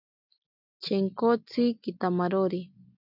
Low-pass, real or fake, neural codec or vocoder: 5.4 kHz; real; none